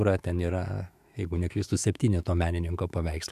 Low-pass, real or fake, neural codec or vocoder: 14.4 kHz; fake; autoencoder, 48 kHz, 128 numbers a frame, DAC-VAE, trained on Japanese speech